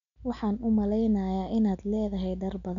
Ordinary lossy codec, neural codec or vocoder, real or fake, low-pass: none; none; real; 7.2 kHz